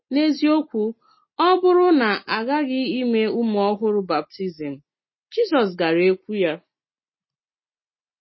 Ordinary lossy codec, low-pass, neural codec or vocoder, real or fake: MP3, 24 kbps; 7.2 kHz; none; real